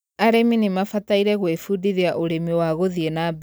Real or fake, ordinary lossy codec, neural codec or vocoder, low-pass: real; none; none; none